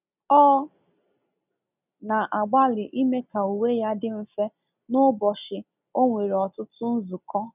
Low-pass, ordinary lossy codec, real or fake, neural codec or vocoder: 3.6 kHz; none; real; none